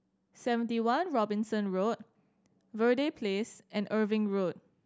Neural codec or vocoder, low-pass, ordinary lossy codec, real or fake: none; none; none; real